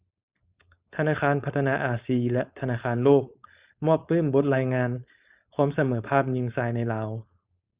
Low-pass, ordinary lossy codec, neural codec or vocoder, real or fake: 3.6 kHz; Opus, 64 kbps; codec, 16 kHz, 4.8 kbps, FACodec; fake